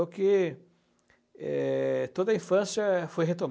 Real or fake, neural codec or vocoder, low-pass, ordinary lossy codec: real; none; none; none